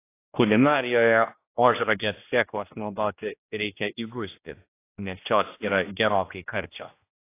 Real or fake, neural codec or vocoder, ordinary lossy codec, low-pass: fake; codec, 16 kHz, 1 kbps, X-Codec, HuBERT features, trained on general audio; AAC, 24 kbps; 3.6 kHz